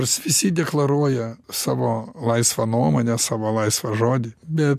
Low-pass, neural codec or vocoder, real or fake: 14.4 kHz; none; real